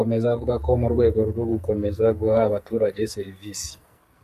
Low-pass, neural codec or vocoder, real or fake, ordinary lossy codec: 14.4 kHz; codec, 44.1 kHz, 2.6 kbps, SNAC; fake; AAC, 96 kbps